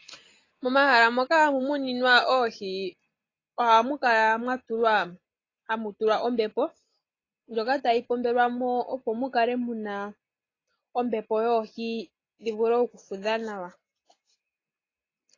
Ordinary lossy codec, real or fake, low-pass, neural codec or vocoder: AAC, 32 kbps; real; 7.2 kHz; none